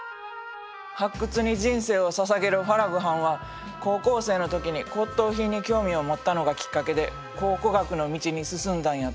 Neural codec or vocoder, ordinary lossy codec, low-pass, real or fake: none; none; none; real